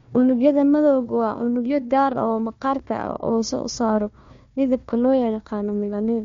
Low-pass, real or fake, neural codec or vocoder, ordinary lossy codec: 7.2 kHz; fake; codec, 16 kHz, 1 kbps, FunCodec, trained on Chinese and English, 50 frames a second; MP3, 48 kbps